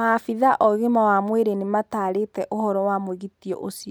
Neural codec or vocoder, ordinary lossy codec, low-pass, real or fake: none; none; none; real